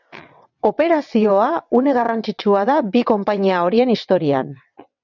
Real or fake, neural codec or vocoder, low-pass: fake; vocoder, 22.05 kHz, 80 mel bands, WaveNeXt; 7.2 kHz